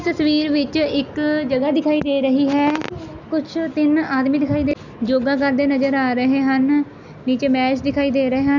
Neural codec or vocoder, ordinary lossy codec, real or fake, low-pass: none; none; real; 7.2 kHz